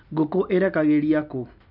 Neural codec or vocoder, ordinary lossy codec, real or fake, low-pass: none; none; real; 5.4 kHz